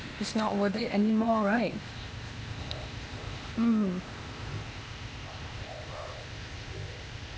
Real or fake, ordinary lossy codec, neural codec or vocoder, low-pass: fake; none; codec, 16 kHz, 0.8 kbps, ZipCodec; none